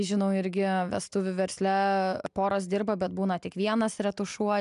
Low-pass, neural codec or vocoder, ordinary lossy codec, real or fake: 10.8 kHz; none; MP3, 96 kbps; real